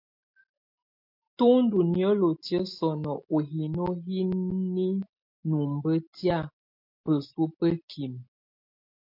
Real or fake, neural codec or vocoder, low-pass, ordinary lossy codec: real; none; 5.4 kHz; MP3, 48 kbps